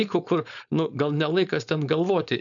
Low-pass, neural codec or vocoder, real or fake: 7.2 kHz; codec, 16 kHz, 4.8 kbps, FACodec; fake